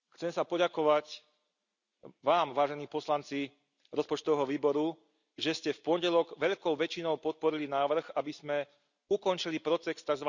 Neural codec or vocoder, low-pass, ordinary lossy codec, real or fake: none; 7.2 kHz; none; real